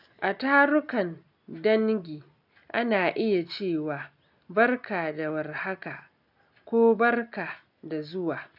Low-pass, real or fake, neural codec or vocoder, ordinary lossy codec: 5.4 kHz; real; none; none